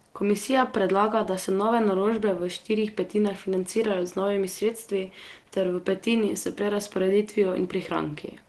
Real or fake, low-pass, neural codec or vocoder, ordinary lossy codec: real; 10.8 kHz; none; Opus, 16 kbps